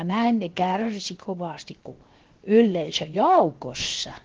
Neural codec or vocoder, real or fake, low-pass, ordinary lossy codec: codec, 16 kHz, 0.7 kbps, FocalCodec; fake; 7.2 kHz; Opus, 16 kbps